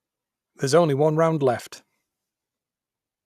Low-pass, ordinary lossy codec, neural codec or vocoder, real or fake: 14.4 kHz; none; none; real